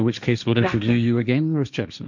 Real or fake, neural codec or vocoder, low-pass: fake; codec, 16 kHz, 1.1 kbps, Voila-Tokenizer; 7.2 kHz